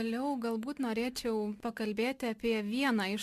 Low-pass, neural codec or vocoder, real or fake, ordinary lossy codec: 14.4 kHz; none; real; Opus, 64 kbps